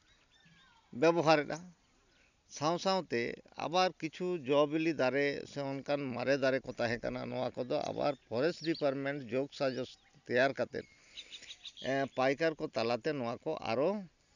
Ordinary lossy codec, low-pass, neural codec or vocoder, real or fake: none; 7.2 kHz; none; real